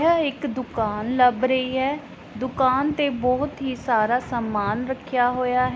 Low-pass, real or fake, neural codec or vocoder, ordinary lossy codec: none; real; none; none